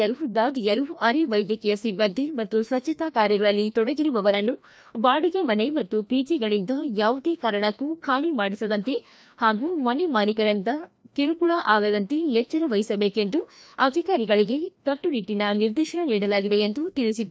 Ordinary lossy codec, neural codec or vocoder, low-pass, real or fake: none; codec, 16 kHz, 1 kbps, FreqCodec, larger model; none; fake